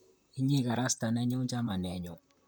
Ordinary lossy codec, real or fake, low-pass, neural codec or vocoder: none; fake; none; vocoder, 44.1 kHz, 128 mel bands, Pupu-Vocoder